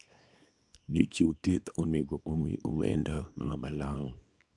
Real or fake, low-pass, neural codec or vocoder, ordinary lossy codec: fake; 10.8 kHz; codec, 24 kHz, 0.9 kbps, WavTokenizer, small release; none